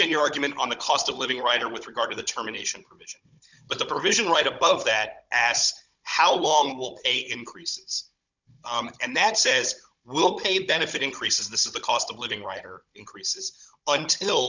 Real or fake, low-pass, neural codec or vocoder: fake; 7.2 kHz; codec, 16 kHz, 16 kbps, FunCodec, trained on Chinese and English, 50 frames a second